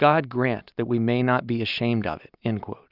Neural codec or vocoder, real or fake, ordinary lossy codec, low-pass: none; real; Opus, 64 kbps; 5.4 kHz